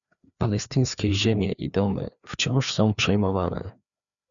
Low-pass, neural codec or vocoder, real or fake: 7.2 kHz; codec, 16 kHz, 2 kbps, FreqCodec, larger model; fake